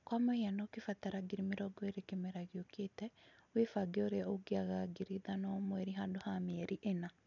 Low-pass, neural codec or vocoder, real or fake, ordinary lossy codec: 7.2 kHz; none; real; none